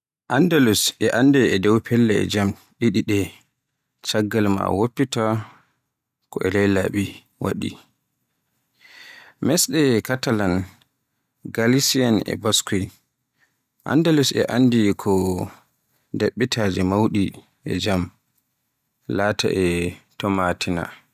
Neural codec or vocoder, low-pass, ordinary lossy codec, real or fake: none; 14.4 kHz; none; real